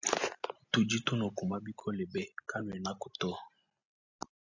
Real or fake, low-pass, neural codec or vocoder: real; 7.2 kHz; none